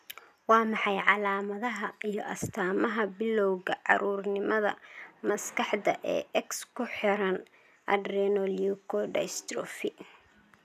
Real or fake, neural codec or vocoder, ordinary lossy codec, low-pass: real; none; none; 14.4 kHz